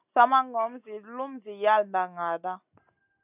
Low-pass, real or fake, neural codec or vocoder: 3.6 kHz; real; none